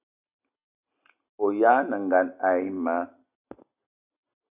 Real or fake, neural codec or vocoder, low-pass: real; none; 3.6 kHz